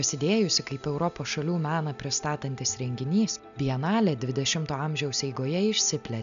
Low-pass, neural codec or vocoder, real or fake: 7.2 kHz; none; real